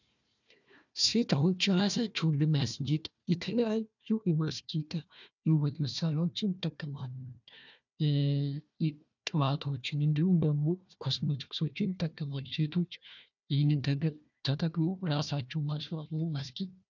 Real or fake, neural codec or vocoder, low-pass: fake; codec, 16 kHz, 1 kbps, FunCodec, trained on Chinese and English, 50 frames a second; 7.2 kHz